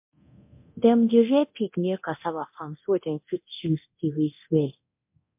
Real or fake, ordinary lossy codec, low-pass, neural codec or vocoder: fake; MP3, 32 kbps; 3.6 kHz; codec, 24 kHz, 0.9 kbps, DualCodec